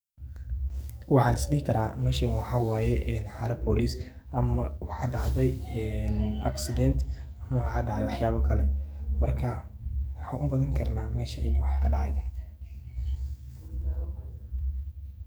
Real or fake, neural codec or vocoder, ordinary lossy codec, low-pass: fake; codec, 44.1 kHz, 2.6 kbps, SNAC; none; none